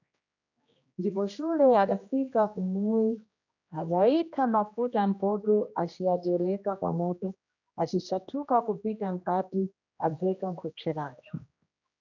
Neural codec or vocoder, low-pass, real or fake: codec, 16 kHz, 1 kbps, X-Codec, HuBERT features, trained on general audio; 7.2 kHz; fake